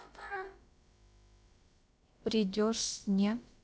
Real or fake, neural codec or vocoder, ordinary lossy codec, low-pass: fake; codec, 16 kHz, about 1 kbps, DyCAST, with the encoder's durations; none; none